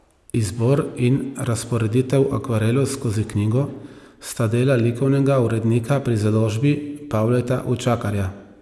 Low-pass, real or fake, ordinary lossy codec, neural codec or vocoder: none; real; none; none